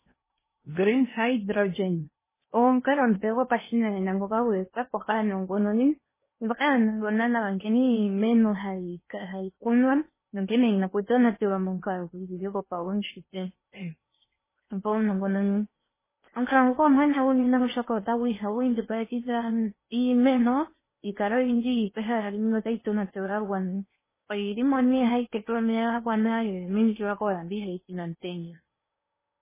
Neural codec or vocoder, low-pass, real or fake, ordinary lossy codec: codec, 16 kHz in and 24 kHz out, 0.8 kbps, FocalCodec, streaming, 65536 codes; 3.6 kHz; fake; MP3, 16 kbps